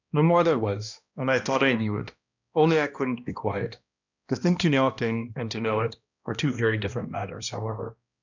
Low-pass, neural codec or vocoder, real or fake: 7.2 kHz; codec, 16 kHz, 1 kbps, X-Codec, HuBERT features, trained on balanced general audio; fake